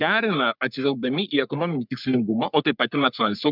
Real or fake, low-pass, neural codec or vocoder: fake; 5.4 kHz; codec, 44.1 kHz, 3.4 kbps, Pupu-Codec